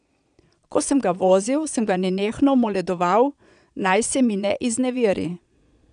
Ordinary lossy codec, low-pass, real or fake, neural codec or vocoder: none; 9.9 kHz; fake; vocoder, 22.05 kHz, 80 mel bands, Vocos